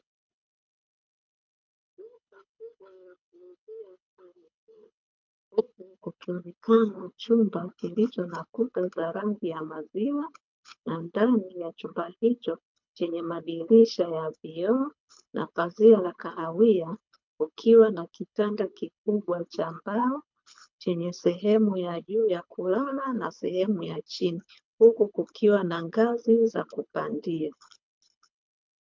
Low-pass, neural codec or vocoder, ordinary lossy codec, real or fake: 7.2 kHz; codec, 24 kHz, 6 kbps, HILCodec; MP3, 64 kbps; fake